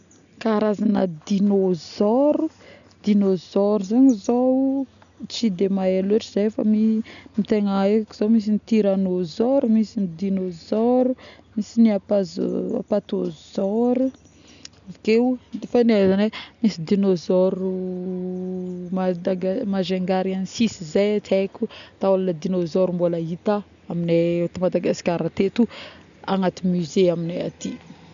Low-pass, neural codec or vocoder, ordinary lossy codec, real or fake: 7.2 kHz; none; none; real